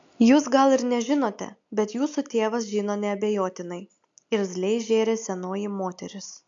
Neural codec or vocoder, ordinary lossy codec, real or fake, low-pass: none; AAC, 64 kbps; real; 7.2 kHz